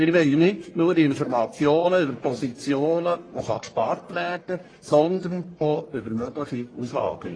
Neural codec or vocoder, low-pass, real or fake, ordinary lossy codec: codec, 44.1 kHz, 1.7 kbps, Pupu-Codec; 9.9 kHz; fake; AAC, 32 kbps